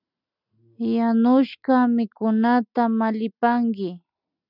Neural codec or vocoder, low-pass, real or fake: none; 5.4 kHz; real